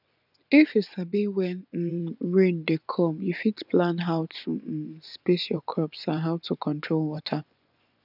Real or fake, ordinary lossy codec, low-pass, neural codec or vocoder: fake; none; 5.4 kHz; vocoder, 24 kHz, 100 mel bands, Vocos